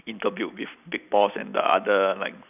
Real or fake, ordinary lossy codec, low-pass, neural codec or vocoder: real; none; 3.6 kHz; none